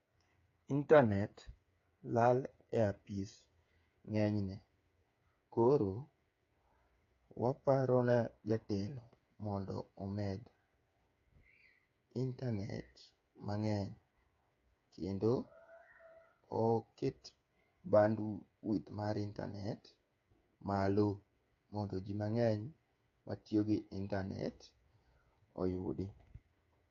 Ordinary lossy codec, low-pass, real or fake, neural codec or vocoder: AAC, 48 kbps; 7.2 kHz; fake; codec, 16 kHz, 8 kbps, FreqCodec, smaller model